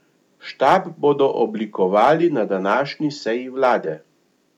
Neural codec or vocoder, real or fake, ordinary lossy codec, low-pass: none; real; none; 19.8 kHz